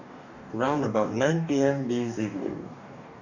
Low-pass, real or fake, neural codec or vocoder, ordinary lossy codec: 7.2 kHz; fake; codec, 44.1 kHz, 2.6 kbps, DAC; none